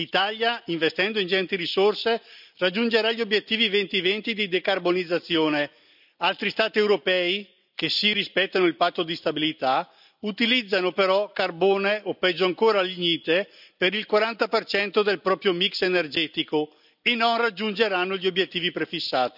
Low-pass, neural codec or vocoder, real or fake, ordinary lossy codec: 5.4 kHz; none; real; none